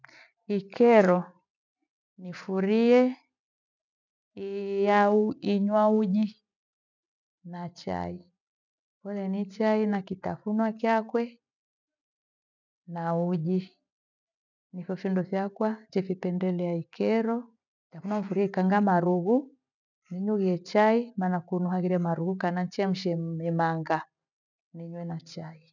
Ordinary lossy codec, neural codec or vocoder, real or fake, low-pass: none; none; real; 7.2 kHz